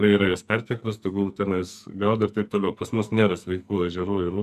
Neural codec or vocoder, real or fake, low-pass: codec, 44.1 kHz, 2.6 kbps, SNAC; fake; 14.4 kHz